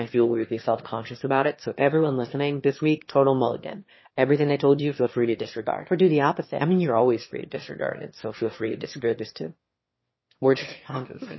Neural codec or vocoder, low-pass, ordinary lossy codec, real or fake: autoencoder, 22.05 kHz, a latent of 192 numbers a frame, VITS, trained on one speaker; 7.2 kHz; MP3, 24 kbps; fake